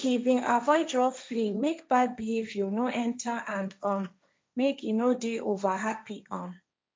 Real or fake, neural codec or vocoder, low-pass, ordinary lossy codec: fake; codec, 16 kHz, 1.1 kbps, Voila-Tokenizer; none; none